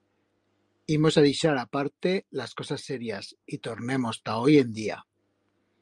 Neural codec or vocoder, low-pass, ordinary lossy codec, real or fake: none; 10.8 kHz; Opus, 32 kbps; real